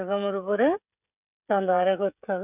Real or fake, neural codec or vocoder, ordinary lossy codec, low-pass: fake; codec, 16 kHz, 16 kbps, FreqCodec, smaller model; none; 3.6 kHz